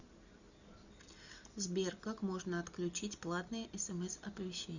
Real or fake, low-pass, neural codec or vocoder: real; 7.2 kHz; none